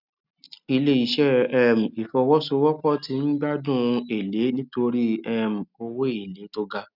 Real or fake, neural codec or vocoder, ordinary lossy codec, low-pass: real; none; none; 5.4 kHz